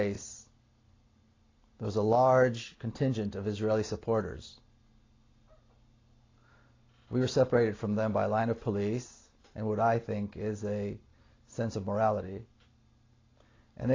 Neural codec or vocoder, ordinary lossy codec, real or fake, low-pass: none; AAC, 32 kbps; real; 7.2 kHz